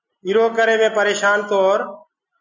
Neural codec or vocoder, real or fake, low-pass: none; real; 7.2 kHz